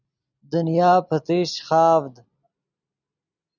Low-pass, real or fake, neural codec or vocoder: 7.2 kHz; real; none